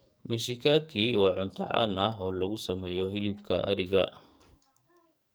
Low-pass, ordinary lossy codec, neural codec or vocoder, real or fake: none; none; codec, 44.1 kHz, 2.6 kbps, SNAC; fake